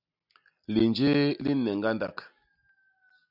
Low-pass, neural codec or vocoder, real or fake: 5.4 kHz; none; real